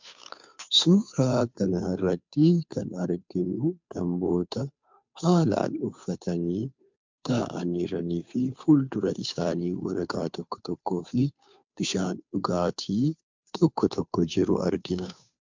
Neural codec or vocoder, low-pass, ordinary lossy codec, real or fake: codec, 16 kHz, 2 kbps, FunCodec, trained on Chinese and English, 25 frames a second; 7.2 kHz; MP3, 64 kbps; fake